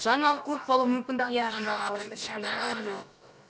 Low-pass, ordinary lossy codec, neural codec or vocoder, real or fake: none; none; codec, 16 kHz, about 1 kbps, DyCAST, with the encoder's durations; fake